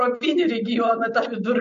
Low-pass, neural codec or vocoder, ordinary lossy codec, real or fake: 7.2 kHz; none; MP3, 48 kbps; real